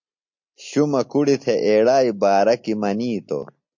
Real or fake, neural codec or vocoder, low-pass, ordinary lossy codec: real; none; 7.2 kHz; MP3, 48 kbps